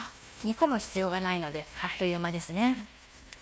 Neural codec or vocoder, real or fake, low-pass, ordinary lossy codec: codec, 16 kHz, 1 kbps, FunCodec, trained on Chinese and English, 50 frames a second; fake; none; none